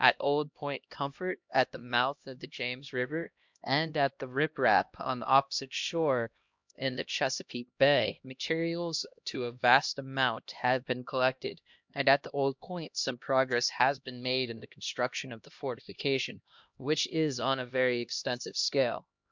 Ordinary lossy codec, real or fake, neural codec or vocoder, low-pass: MP3, 64 kbps; fake; codec, 16 kHz, 1 kbps, X-Codec, HuBERT features, trained on LibriSpeech; 7.2 kHz